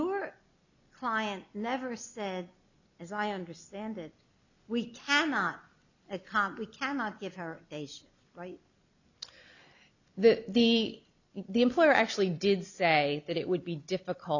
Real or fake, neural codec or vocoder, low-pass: real; none; 7.2 kHz